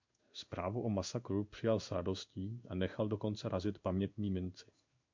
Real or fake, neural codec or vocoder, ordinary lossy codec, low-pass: fake; codec, 16 kHz in and 24 kHz out, 1 kbps, XY-Tokenizer; MP3, 64 kbps; 7.2 kHz